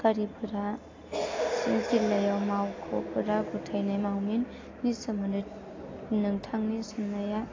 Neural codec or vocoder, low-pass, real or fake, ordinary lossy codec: none; 7.2 kHz; real; AAC, 32 kbps